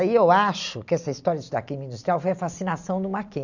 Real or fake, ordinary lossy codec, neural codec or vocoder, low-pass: real; none; none; 7.2 kHz